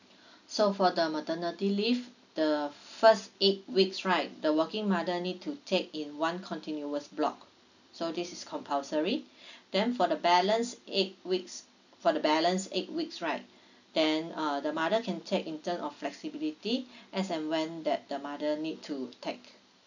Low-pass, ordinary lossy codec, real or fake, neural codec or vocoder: 7.2 kHz; none; real; none